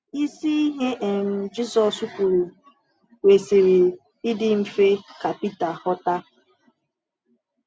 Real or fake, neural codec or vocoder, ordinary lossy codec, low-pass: real; none; none; none